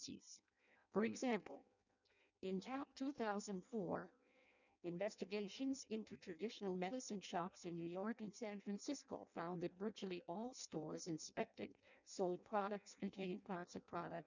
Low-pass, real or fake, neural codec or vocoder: 7.2 kHz; fake; codec, 16 kHz in and 24 kHz out, 0.6 kbps, FireRedTTS-2 codec